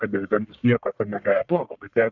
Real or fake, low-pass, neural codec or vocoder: fake; 7.2 kHz; codec, 44.1 kHz, 1.7 kbps, Pupu-Codec